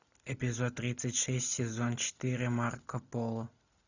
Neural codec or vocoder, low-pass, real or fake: none; 7.2 kHz; real